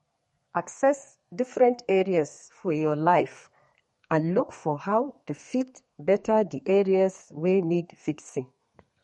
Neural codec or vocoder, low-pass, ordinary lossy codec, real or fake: codec, 32 kHz, 1.9 kbps, SNAC; 14.4 kHz; MP3, 48 kbps; fake